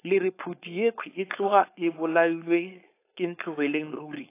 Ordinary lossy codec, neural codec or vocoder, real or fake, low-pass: AAC, 24 kbps; codec, 16 kHz, 4.8 kbps, FACodec; fake; 3.6 kHz